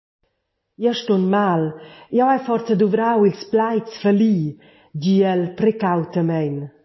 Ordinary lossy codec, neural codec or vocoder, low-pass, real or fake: MP3, 24 kbps; none; 7.2 kHz; real